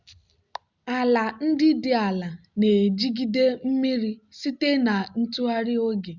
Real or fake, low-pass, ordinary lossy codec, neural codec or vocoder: real; 7.2 kHz; Opus, 64 kbps; none